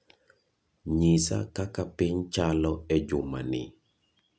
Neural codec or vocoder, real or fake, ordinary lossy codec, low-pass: none; real; none; none